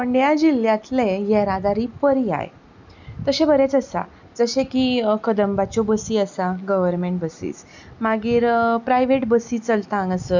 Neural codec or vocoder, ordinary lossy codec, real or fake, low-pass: none; none; real; 7.2 kHz